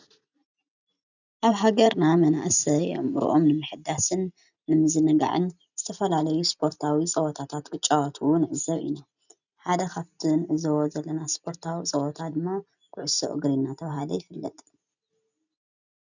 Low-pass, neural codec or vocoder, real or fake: 7.2 kHz; none; real